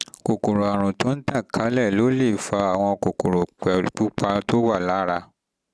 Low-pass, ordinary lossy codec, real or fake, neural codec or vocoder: none; none; real; none